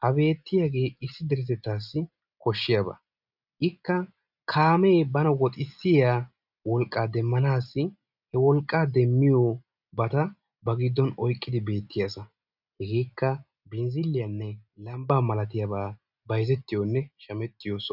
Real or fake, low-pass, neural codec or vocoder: real; 5.4 kHz; none